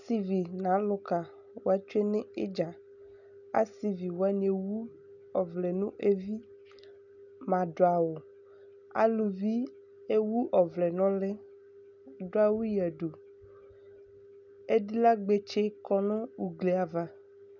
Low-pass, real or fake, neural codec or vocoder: 7.2 kHz; real; none